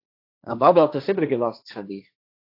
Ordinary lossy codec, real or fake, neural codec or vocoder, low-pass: AAC, 32 kbps; fake; codec, 16 kHz, 1.1 kbps, Voila-Tokenizer; 5.4 kHz